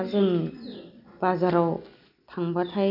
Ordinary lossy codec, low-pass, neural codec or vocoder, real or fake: none; 5.4 kHz; none; real